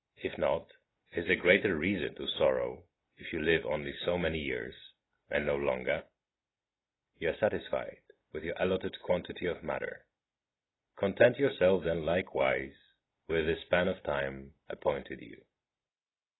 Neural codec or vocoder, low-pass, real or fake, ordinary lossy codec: none; 7.2 kHz; real; AAC, 16 kbps